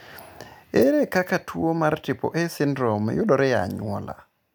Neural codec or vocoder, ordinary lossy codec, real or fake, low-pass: none; none; real; none